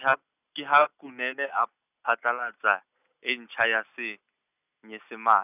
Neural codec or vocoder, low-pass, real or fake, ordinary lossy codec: none; 3.6 kHz; real; none